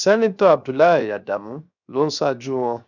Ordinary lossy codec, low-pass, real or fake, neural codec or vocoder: none; 7.2 kHz; fake; codec, 16 kHz, 0.7 kbps, FocalCodec